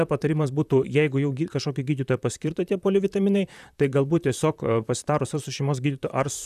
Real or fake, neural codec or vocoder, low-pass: fake; vocoder, 44.1 kHz, 128 mel bands, Pupu-Vocoder; 14.4 kHz